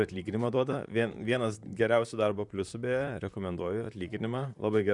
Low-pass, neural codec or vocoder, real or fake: 10.8 kHz; vocoder, 44.1 kHz, 128 mel bands, Pupu-Vocoder; fake